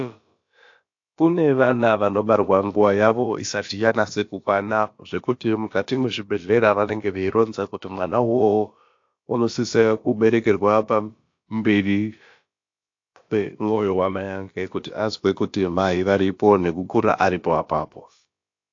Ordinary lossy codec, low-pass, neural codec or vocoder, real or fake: AAC, 48 kbps; 7.2 kHz; codec, 16 kHz, about 1 kbps, DyCAST, with the encoder's durations; fake